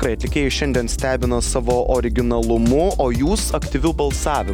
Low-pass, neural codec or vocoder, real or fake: 19.8 kHz; none; real